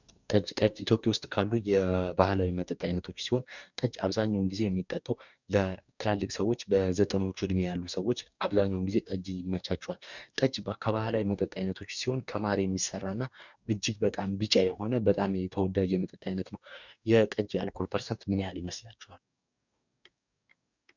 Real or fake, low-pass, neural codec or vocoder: fake; 7.2 kHz; codec, 44.1 kHz, 2.6 kbps, DAC